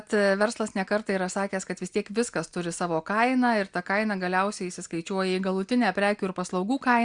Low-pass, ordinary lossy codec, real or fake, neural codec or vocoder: 9.9 kHz; Opus, 64 kbps; real; none